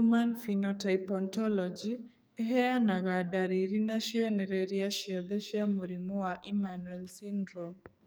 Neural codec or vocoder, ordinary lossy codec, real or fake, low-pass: codec, 44.1 kHz, 2.6 kbps, SNAC; none; fake; none